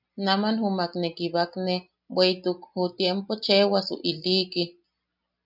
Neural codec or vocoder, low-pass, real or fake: none; 5.4 kHz; real